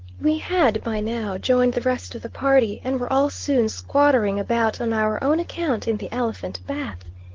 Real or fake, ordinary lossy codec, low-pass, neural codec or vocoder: real; Opus, 16 kbps; 7.2 kHz; none